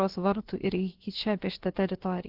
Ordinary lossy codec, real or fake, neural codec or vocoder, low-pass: Opus, 16 kbps; fake; codec, 16 kHz, about 1 kbps, DyCAST, with the encoder's durations; 5.4 kHz